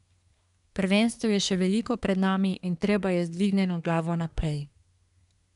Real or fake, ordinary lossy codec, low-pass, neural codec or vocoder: fake; none; 10.8 kHz; codec, 24 kHz, 1 kbps, SNAC